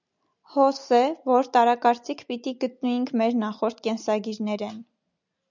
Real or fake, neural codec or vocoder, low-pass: real; none; 7.2 kHz